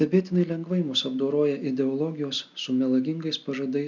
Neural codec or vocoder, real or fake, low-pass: none; real; 7.2 kHz